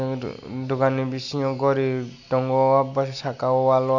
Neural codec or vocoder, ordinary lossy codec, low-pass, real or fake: none; none; 7.2 kHz; real